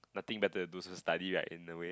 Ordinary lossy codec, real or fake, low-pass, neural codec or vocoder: none; real; none; none